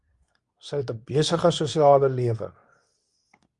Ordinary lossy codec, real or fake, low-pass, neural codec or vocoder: Opus, 64 kbps; fake; 10.8 kHz; codec, 24 kHz, 0.9 kbps, WavTokenizer, medium speech release version 2